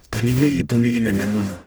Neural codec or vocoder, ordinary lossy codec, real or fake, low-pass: codec, 44.1 kHz, 0.9 kbps, DAC; none; fake; none